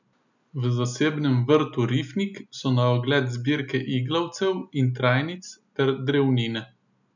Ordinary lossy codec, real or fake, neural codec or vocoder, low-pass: none; real; none; 7.2 kHz